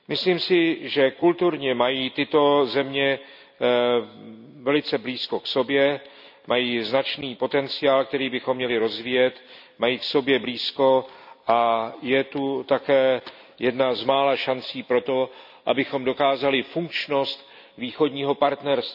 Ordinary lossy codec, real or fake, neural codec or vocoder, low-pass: none; real; none; 5.4 kHz